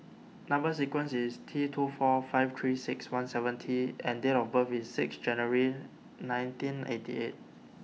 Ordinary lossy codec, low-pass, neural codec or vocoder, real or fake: none; none; none; real